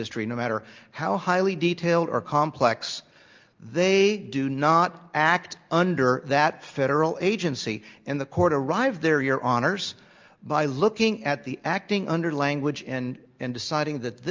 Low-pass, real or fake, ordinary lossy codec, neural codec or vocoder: 7.2 kHz; real; Opus, 24 kbps; none